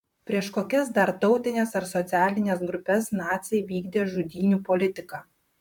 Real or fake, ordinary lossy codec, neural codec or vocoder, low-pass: fake; MP3, 96 kbps; vocoder, 44.1 kHz, 128 mel bands, Pupu-Vocoder; 19.8 kHz